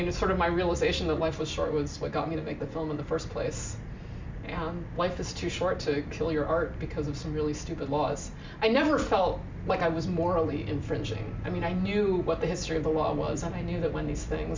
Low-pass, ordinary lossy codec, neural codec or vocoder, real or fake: 7.2 kHz; MP3, 64 kbps; none; real